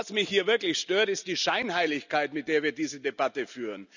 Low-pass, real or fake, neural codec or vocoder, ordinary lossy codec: 7.2 kHz; real; none; none